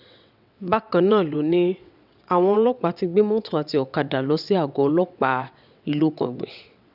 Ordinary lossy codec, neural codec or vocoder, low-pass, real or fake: none; vocoder, 22.05 kHz, 80 mel bands, WaveNeXt; 5.4 kHz; fake